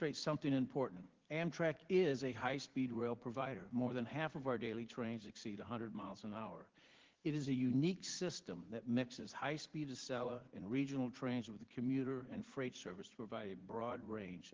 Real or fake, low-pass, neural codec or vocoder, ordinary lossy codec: fake; 7.2 kHz; vocoder, 44.1 kHz, 80 mel bands, Vocos; Opus, 16 kbps